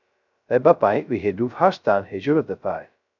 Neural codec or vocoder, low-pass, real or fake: codec, 16 kHz, 0.2 kbps, FocalCodec; 7.2 kHz; fake